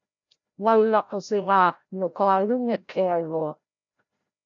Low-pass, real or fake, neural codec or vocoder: 7.2 kHz; fake; codec, 16 kHz, 0.5 kbps, FreqCodec, larger model